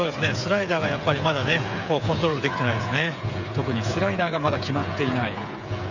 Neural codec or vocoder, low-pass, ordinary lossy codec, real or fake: codec, 16 kHz, 8 kbps, FreqCodec, smaller model; 7.2 kHz; none; fake